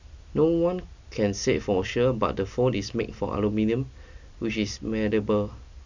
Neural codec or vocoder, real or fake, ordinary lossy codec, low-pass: none; real; none; 7.2 kHz